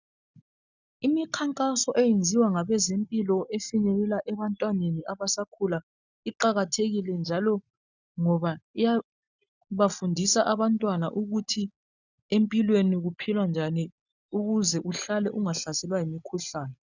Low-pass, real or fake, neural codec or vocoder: 7.2 kHz; real; none